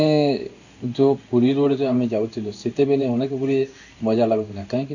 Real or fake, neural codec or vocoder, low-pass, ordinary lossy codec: fake; codec, 16 kHz in and 24 kHz out, 1 kbps, XY-Tokenizer; 7.2 kHz; none